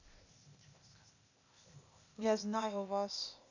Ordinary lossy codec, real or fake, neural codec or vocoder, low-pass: none; fake; codec, 16 kHz, 0.8 kbps, ZipCodec; 7.2 kHz